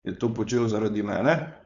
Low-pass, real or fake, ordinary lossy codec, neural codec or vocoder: 7.2 kHz; fake; Opus, 64 kbps; codec, 16 kHz, 4.8 kbps, FACodec